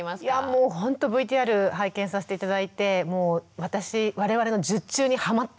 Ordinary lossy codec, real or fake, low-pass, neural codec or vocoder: none; real; none; none